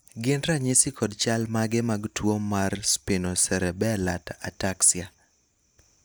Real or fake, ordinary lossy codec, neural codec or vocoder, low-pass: real; none; none; none